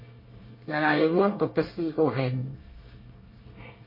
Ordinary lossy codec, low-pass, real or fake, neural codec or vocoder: MP3, 24 kbps; 5.4 kHz; fake; codec, 24 kHz, 1 kbps, SNAC